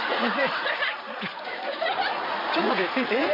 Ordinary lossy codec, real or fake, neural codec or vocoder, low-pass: MP3, 24 kbps; fake; vocoder, 44.1 kHz, 80 mel bands, Vocos; 5.4 kHz